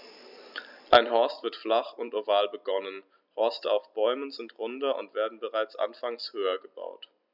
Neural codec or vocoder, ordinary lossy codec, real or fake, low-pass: none; none; real; 5.4 kHz